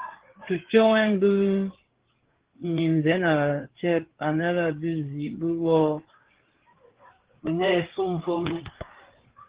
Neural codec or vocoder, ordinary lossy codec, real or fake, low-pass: codec, 16 kHz, 4 kbps, FreqCodec, larger model; Opus, 16 kbps; fake; 3.6 kHz